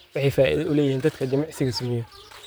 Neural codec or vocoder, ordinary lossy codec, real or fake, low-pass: vocoder, 44.1 kHz, 128 mel bands, Pupu-Vocoder; none; fake; none